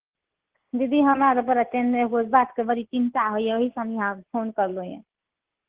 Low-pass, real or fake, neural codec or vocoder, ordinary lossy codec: 3.6 kHz; real; none; Opus, 16 kbps